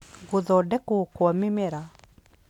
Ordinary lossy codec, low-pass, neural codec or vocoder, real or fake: none; 19.8 kHz; none; real